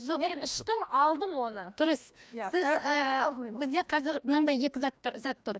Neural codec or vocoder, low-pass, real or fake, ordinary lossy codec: codec, 16 kHz, 1 kbps, FreqCodec, larger model; none; fake; none